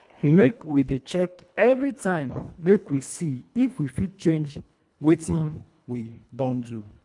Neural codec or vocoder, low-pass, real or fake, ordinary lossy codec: codec, 24 kHz, 1.5 kbps, HILCodec; 10.8 kHz; fake; MP3, 96 kbps